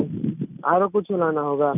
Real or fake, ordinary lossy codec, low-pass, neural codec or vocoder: real; none; 3.6 kHz; none